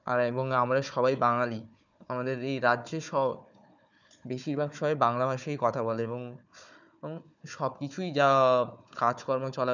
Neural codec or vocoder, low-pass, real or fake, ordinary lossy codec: codec, 16 kHz, 4 kbps, FunCodec, trained on Chinese and English, 50 frames a second; 7.2 kHz; fake; none